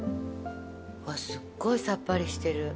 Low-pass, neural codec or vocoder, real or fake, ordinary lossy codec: none; none; real; none